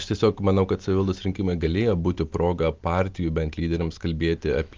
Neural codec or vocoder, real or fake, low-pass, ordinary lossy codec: none; real; 7.2 kHz; Opus, 32 kbps